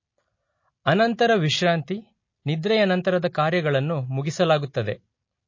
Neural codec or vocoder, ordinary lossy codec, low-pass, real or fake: none; MP3, 32 kbps; 7.2 kHz; real